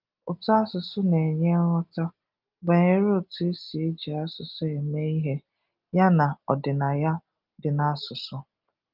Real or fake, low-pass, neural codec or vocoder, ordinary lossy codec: real; 5.4 kHz; none; Opus, 24 kbps